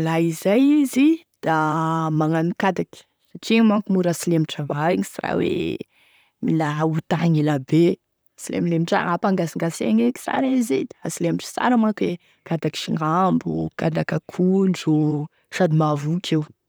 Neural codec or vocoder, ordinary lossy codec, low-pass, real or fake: vocoder, 44.1 kHz, 128 mel bands, Pupu-Vocoder; none; none; fake